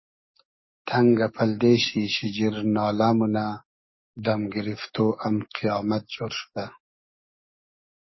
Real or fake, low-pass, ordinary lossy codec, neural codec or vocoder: fake; 7.2 kHz; MP3, 24 kbps; codec, 44.1 kHz, 7.8 kbps, DAC